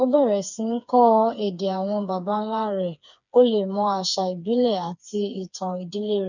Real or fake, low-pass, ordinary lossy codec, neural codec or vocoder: fake; 7.2 kHz; none; codec, 16 kHz, 4 kbps, FreqCodec, smaller model